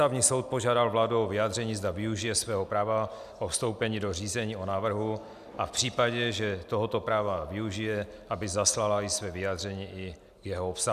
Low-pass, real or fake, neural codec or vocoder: 14.4 kHz; real; none